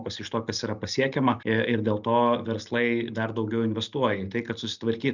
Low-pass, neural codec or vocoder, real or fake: 7.2 kHz; none; real